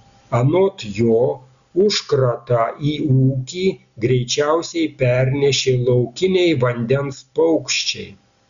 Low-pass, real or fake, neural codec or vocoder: 7.2 kHz; real; none